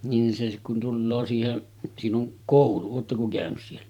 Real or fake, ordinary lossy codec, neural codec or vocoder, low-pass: fake; none; vocoder, 44.1 kHz, 128 mel bands every 512 samples, BigVGAN v2; 19.8 kHz